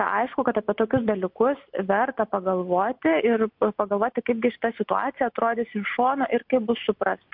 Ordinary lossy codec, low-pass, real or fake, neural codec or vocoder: MP3, 48 kbps; 5.4 kHz; real; none